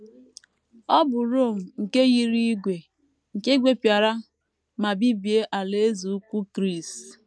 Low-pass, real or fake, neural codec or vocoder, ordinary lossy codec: none; real; none; none